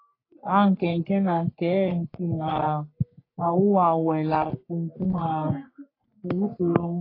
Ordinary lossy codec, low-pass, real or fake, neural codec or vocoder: AAC, 32 kbps; 5.4 kHz; fake; codec, 44.1 kHz, 3.4 kbps, Pupu-Codec